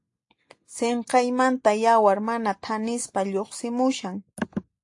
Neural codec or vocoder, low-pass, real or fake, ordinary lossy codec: none; 10.8 kHz; real; AAC, 48 kbps